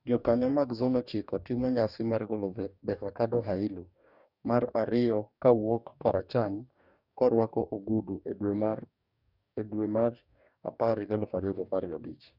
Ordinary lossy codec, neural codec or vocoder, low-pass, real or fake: none; codec, 44.1 kHz, 2.6 kbps, DAC; 5.4 kHz; fake